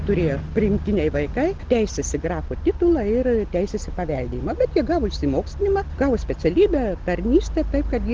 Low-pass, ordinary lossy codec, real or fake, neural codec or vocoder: 7.2 kHz; Opus, 16 kbps; real; none